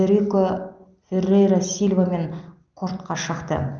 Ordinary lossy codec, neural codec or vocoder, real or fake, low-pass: Opus, 24 kbps; none; real; 7.2 kHz